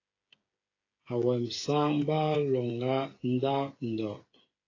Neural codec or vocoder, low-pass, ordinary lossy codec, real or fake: codec, 16 kHz, 8 kbps, FreqCodec, smaller model; 7.2 kHz; AAC, 32 kbps; fake